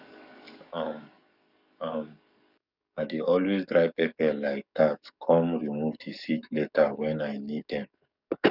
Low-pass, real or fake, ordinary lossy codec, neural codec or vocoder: 5.4 kHz; fake; none; codec, 44.1 kHz, 7.8 kbps, DAC